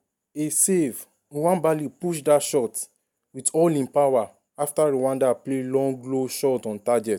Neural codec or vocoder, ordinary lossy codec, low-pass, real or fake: none; none; 19.8 kHz; real